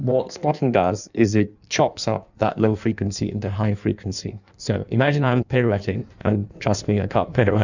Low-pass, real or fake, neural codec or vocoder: 7.2 kHz; fake; codec, 16 kHz in and 24 kHz out, 1.1 kbps, FireRedTTS-2 codec